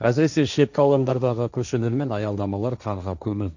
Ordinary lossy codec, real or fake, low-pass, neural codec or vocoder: none; fake; 7.2 kHz; codec, 16 kHz, 1.1 kbps, Voila-Tokenizer